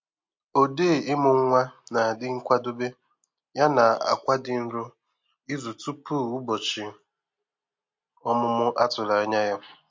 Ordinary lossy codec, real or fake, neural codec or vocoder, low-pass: MP3, 48 kbps; real; none; 7.2 kHz